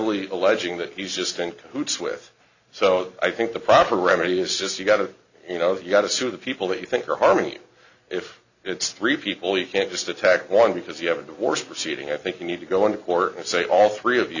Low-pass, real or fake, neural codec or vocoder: 7.2 kHz; real; none